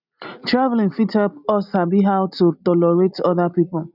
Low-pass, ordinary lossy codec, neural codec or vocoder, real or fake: 5.4 kHz; none; none; real